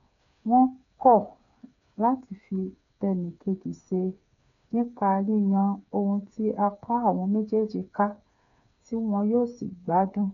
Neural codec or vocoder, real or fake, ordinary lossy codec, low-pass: codec, 16 kHz, 8 kbps, FreqCodec, smaller model; fake; none; 7.2 kHz